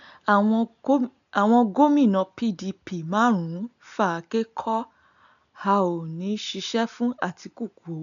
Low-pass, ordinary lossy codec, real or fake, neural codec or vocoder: 7.2 kHz; none; real; none